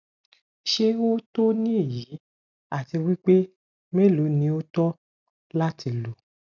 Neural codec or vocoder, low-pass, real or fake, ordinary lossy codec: none; 7.2 kHz; real; none